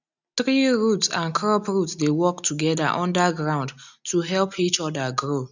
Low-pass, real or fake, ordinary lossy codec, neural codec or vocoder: 7.2 kHz; real; none; none